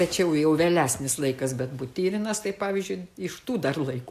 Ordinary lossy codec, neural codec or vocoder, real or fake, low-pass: AAC, 96 kbps; none; real; 14.4 kHz